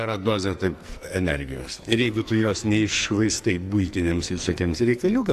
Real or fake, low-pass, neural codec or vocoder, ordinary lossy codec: fake; 14.4 kHz; codec, 44.1 kHz, 2.6 kbps, SNAC; AAC, 64 kbps